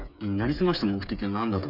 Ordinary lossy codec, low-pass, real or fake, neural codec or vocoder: none; 5.4 kHz; fake; codec, 16 kHz, 8 kbps, FreqCodec, smaller model